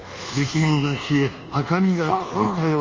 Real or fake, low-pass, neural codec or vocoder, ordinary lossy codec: fake; 7.2 kHz; codec, 24 kHz, 1.2 kbps, DualCodec; Opus, 32 kbps